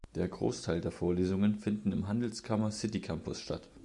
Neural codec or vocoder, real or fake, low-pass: none; real; 10.8 kHz